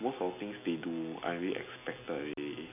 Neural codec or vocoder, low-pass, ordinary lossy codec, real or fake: none; 3.6 kHz; none; real